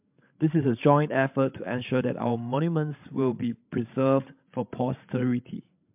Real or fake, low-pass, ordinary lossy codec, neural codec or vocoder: fake; 3.6 kHz; MP3, 32 kbps; codec, 16 kHz, 16 kbps, FreqCodec, larger model